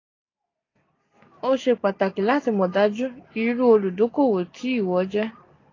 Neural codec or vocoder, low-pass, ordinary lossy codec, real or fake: none; 7.2 kHz; AAC, 32 kbps; real